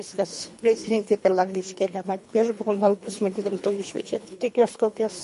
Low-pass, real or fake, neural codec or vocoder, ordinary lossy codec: 10.8 kHz; fake; codec, 24 kHz, 3 kbps, HILCodec; MP3, 48 kbps